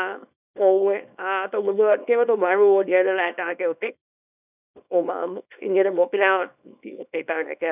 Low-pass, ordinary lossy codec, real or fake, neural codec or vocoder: 3.6 kHz; none; fake; codec, 24 kHz, 0.9 kbps, WavTokenizer, small release